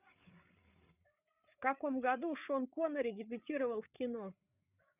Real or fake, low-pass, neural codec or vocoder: fake; 3.6 kHz; codec, 16 kHz, 8 kbps, FreqCodec, larger model